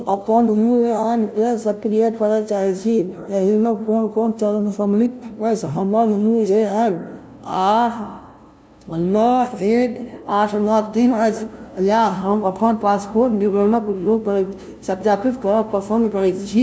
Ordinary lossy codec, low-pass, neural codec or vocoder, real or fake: none; none; codec, 16 kHz, 0.5 kbps, FunCodec, trained on LibriTTS, 25 frames a second; fake